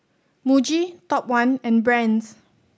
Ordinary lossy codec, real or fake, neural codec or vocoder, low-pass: none; real; none; none